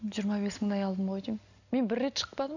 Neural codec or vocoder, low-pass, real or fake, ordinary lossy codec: none; 7.2 kHz; real; none